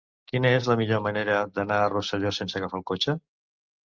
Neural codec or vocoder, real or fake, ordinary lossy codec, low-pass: none; real; Opus, 24 kbps; 7.2 kHz